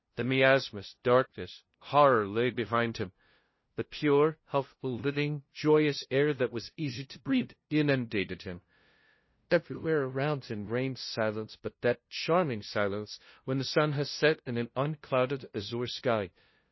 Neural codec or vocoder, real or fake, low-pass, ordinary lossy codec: codec, 16 kHz, 0.5 kbps, FunCodec, trained on LibriTTS, 25 frames a second; fake; 7.2 kHz; MP3, 24 kbps